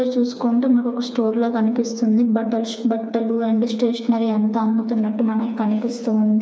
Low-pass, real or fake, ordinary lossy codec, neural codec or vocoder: none; fake; none; codec, 16 kHz, 4 kbps, FreqCodec, smaller model